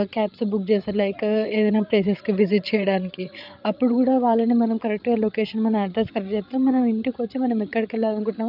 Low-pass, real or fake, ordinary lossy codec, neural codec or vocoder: 5.4 kHz; fake; none; codec, 16 kHz, 8 kbps, FreqCodec, larger model